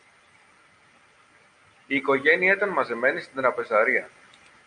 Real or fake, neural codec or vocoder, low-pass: real; none; 9.9 kHz